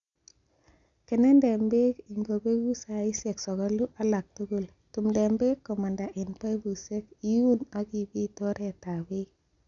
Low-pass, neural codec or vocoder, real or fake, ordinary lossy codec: 7.2 kHz; none; real; none